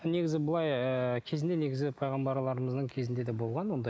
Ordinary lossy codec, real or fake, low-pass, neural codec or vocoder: none; real; none; none